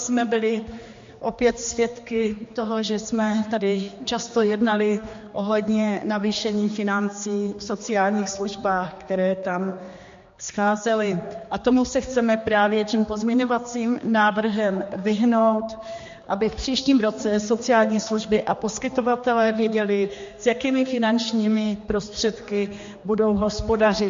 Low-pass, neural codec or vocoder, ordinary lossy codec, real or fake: 7.2 kHz; codec, 16 kHz, 2 kbps, X-Codec, HuBERT features, trained on general audio; MP3, 48 kbps; fake